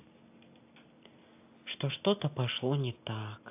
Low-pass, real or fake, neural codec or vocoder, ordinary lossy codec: 3.6 kHz; real; none; AAC, 24 kbps